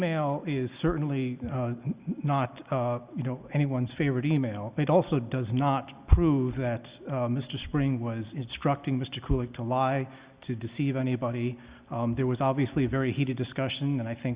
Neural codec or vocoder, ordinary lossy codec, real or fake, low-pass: none; Opus, 64 kbps; real; 3.6 kHz